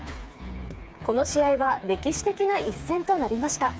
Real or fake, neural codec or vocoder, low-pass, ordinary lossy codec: fake; codec, 16 kHz, 4 kbps, FreqCodec, smaller model; none; none